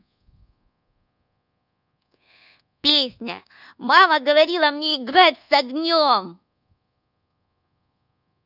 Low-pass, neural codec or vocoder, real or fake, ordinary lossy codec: 5.4 kHz; codec, 24 kHz, 1.2 kbps, DualCodec; fake; none